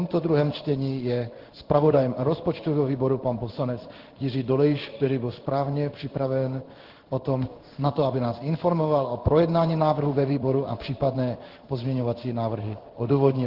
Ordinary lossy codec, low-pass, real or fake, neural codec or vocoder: Opus, 16 kbps; 5.4 kHz; fake; codec, 16 kHz in and 24 kHz out, 1 kbps, XY-Tokenizer